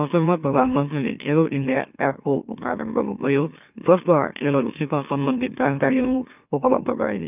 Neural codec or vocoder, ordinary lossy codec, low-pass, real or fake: autoencoder, 44.1 kHz, a latent of 192 numbers a frame, MeloTTS; none; 3.6 kHz; fake